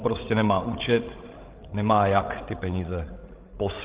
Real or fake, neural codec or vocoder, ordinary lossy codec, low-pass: fake; codec, 16 kHz, 16 kbps, FreqCodec, larger model; Opus, 16 kbps; 3.6 kHz